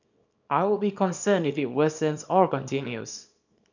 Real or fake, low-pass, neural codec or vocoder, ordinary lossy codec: fake; 7.2 kHz; codec, 24 kHz, 0.9 kbps, WavTokenizer, small release; none